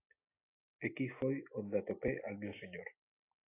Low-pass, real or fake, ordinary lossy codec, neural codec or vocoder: 3.6 kHz; real; AAC, 24 kbps; none